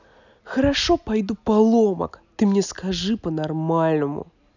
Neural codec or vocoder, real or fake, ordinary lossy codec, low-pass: none; real; none; 7.2 kHz